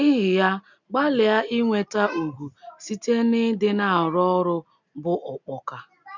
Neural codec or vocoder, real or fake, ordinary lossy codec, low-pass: none; real; none; 7.2 kHz